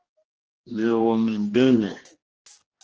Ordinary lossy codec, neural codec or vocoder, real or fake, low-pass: Opus, 16 kbps; codec, 16 kHz, 1 kbps, X-Codec, HuBERT features, trained on general audio; fake; 7.2 kHz